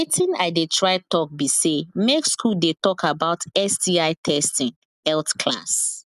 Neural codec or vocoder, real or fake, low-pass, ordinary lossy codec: none; real; 14.4 kHz; none